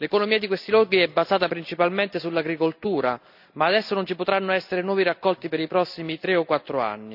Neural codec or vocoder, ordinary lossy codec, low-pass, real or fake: none; none; 5.4 kHz; real